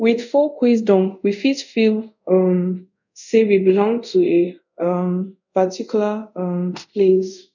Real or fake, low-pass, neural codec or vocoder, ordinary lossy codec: fake; 7.2 kHz; codec, 24 kHz, 0.5 kbps, DualCodec; none